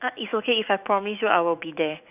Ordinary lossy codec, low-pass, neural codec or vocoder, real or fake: none; 3.6 kHz; autoencoder, 48 kHz, 128 numbers a frame, DAC-VAE, trained on Japanese speech; fake